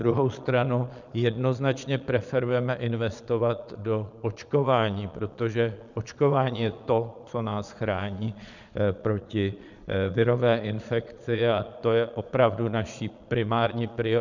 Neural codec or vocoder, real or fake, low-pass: vocoder, 22.05 kHz, 80 mel bands, Vocos; fake; 7.2 kHz